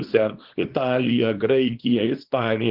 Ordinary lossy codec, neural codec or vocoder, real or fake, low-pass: Opus, 16 kbps; codec, 24 kHz, 0.9 kbps, WavTokenizer, small release; fake; 5.4 kHz